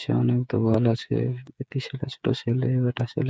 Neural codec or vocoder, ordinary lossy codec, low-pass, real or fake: codec, 16 kHz, 8 kbps, FreqCodec, smaller model; none; none; fake